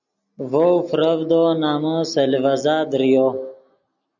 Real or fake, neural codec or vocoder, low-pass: real; none; 7.2 kHz